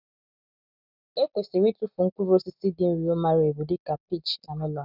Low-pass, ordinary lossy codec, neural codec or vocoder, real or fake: 5.4 kHz; none; none; real